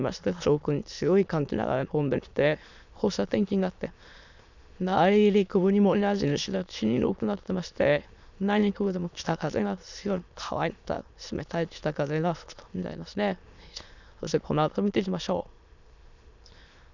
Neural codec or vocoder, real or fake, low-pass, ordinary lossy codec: autoencoder, 22.05 kHz, a latent of 192 numbers a frame, VITS, trained on many speakers; fake; 7.2 kHz; none